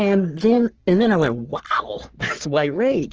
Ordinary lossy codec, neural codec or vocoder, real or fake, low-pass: Opus, 16 kbps; codec, 44.1 kHz, 3.4 kbps, Pupu-Codec; fake; 7.2 kHz